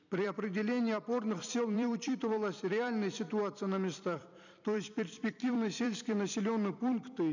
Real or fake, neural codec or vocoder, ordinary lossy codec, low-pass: fake; vocoder, 44.1 kHz, 128 mel bands every 256 samples, BigVGAN v2; none; 7.2 kHz